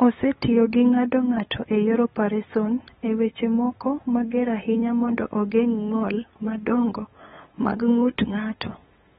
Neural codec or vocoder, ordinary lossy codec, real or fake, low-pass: codec, 16 kHz, 8 kbps, FunCodec, trained on LibriTTS, 25 frames a second; AAC, 16 kbps; fake; 7.2 kHz